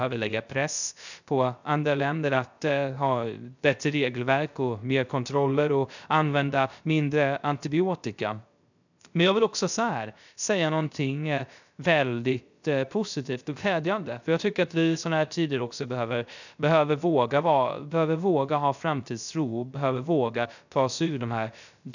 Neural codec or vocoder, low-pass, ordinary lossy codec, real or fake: codec, 16 kHz, 0.3 kbps, FocalCodec; 7.2 kHz; none; fake